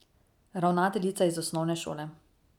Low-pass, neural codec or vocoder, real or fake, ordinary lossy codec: 19.8 kHz; none; real; none